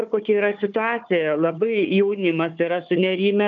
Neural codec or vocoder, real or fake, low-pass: codec, 16 kHz, 4 kbps, FunCodec, trained on Chinese and English, 50 frames a second; fake; 7.2 kHz